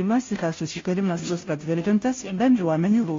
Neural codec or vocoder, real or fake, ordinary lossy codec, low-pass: codec, 16 kHz, 0.5 kbps, FunCodec, trained on Chinese and English, 25 frames a second; fake; AAC, 32 kbps; 7.2 kHz